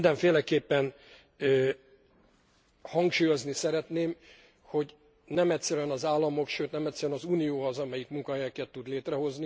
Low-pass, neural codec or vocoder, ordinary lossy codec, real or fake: none; none; none; real